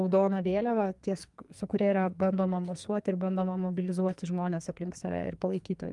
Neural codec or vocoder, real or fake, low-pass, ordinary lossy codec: codec, 44.1 kHz, 2.6 kbps, SNAC; fake; 10.8 kHz; Opus, 32 kbps